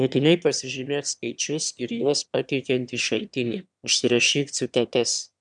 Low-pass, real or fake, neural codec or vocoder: 9.9 kHz; fake; autoencoder, 22.05 kHz, a latent of 192 numbers a frame, VITS, trained on one speaker